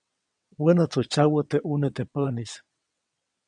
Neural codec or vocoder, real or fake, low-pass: vocoder, 22.05 kHz, 80 mel bands, WaveNeXt; fake; 9.9 kHz